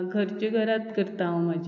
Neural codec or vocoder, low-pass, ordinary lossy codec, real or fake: none; 7.2 kHz; AAC, 48 kbps; real